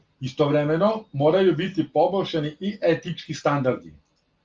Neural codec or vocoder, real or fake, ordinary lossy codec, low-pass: none; real; Opus, 24 kbps; 7.2 kHz